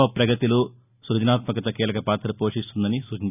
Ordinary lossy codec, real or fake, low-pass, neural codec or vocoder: none; real; 3.6 kHz; none